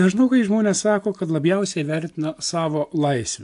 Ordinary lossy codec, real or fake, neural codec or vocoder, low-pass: AAC, 64 kbps; real; none; 10.8 kHz